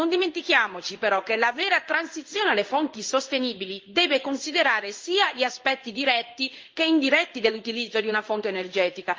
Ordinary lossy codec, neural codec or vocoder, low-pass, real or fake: Opus, 32 kbps; codec, 16 kHz in and 24 kHz out, 1 kbps, XY-Tokenizer; 7.2 kHz; fake